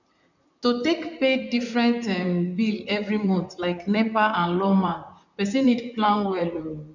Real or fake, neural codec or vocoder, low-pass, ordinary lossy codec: fake; vocoder, 44.1 kHz, 128 mel bands, Pupu-Vocoder; 7.2 kHz; none